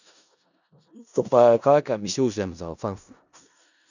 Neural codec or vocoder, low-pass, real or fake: codec, 16 kHz in and 24 kHz out, 0.4 kbps, LongCat-Audio-Codec, four codebook decoder; 7.2 kHz; fake